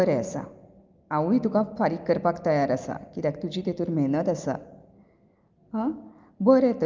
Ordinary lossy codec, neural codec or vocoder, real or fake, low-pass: Opus, 32 kbps; none; real; 7.2 kHz